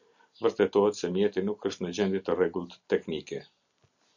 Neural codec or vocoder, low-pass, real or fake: none; 7.2 kHz; real